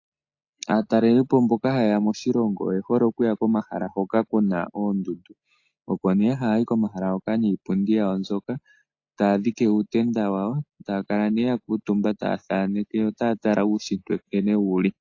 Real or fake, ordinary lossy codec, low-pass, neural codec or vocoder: real; AAC, 48 kbps; 7.2 kHz; none